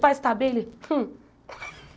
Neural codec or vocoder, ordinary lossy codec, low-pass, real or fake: none; none; none; real